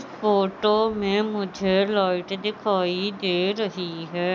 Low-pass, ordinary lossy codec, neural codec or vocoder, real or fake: none; none; none; real